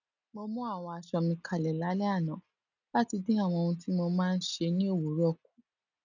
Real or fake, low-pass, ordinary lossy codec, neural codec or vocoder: real; 7.2 kHz; none; none